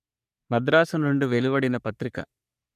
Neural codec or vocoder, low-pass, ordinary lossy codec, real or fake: codec, 44.1 kHz, 3.4 kbps, Pupu-Codec; 14.4 kHz; none; fake